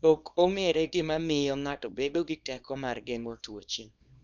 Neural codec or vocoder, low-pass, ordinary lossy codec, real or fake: codec, 24 kHz, 0.9 kbps, WavTokenizer, small release; 7.2 kHz; none; fake